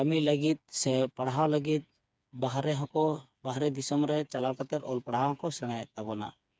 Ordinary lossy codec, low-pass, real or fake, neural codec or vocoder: none; none; fake; codec, 16 kHz, 4 kbps, FreqCodec, smaller model